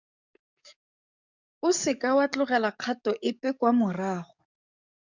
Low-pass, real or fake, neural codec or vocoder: 7.2 kHz; fake; codec, 44.1 kHz, 7.8 kbps, DAC